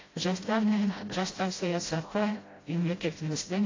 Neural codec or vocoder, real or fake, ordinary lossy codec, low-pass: codec, 16 kHz, 0.5 kbps, FreqCodec, smaller model; fake; AAC, 32 kbps; 7.2 kHz